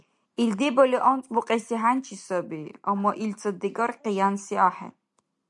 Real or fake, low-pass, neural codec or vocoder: real; 10.8 kHz; none